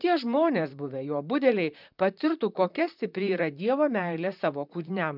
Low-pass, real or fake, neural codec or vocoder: 5.4 kHz; fake; vocoder, 44.1 kHz, 128 mel bands, Pupu-Vocoder